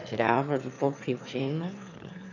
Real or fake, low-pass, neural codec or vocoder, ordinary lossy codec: fake; 7.2 kHz; autoencoder, 22.05 kHz, a latent of 192 numbers a frame, VITS, trained on one speaker; none